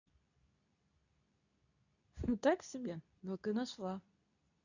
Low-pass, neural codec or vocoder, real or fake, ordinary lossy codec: 7.2 kHz; codec, 24 kHz, 0.9 kbps, WavTokenizer, medium speech release version 2; fake; none